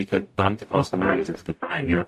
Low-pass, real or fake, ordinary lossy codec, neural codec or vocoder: 14.4 kHz; fake; AAC, 64 kbps; codec, 44.1 kHz, 0.9 kbps, DAC